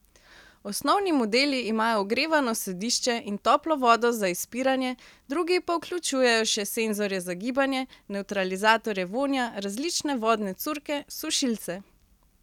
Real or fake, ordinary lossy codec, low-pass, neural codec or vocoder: real; none; 19.8 kHz; none